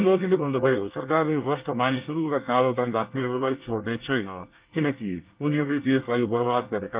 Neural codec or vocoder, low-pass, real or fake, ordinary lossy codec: codec, 24 kHz, 1 kbps, SNAC; 3.6 kHz; fake; Opus, 24 kbps